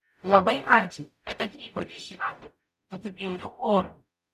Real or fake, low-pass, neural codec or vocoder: fake; 14.4 kHz; codec, 44.1 kHz, 0.9 kbps, DAC